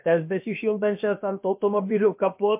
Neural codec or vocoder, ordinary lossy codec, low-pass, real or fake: codec, 16 kHz, about 1 kbps, DyCAST, with the encoder's durations; MP3, 32 kbps; 3.6 kHz; fake